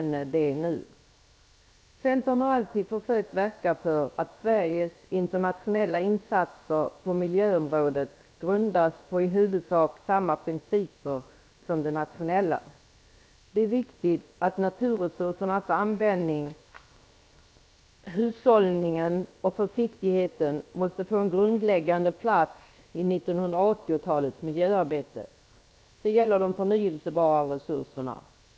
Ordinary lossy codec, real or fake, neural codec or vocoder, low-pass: none; fake; codec, 16 kHz, 0.7 kbps, FocalCodec; none